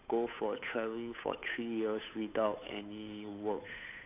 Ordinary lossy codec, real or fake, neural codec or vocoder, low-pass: none; fake; codec, 16 kHz, 8 kbps, FunCodec, trained on Chinese and English, 25 frames a second; 3.6 kHz